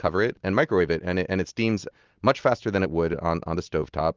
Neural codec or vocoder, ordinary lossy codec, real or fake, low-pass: none; Opus, 32 kbps; real; 7.2 kHz